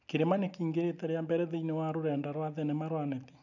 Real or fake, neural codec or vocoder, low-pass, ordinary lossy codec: real; none; 7.2 kHz; none